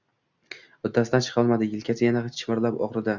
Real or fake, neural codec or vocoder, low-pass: real; none; 7.2 kHz